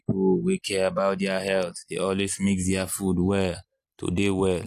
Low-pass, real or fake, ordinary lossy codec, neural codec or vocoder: 14.4 kHz; real; AAC, 64 kbps; none